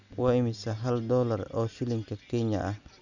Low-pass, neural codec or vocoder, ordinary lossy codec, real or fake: 7.2 kHz; none; none; real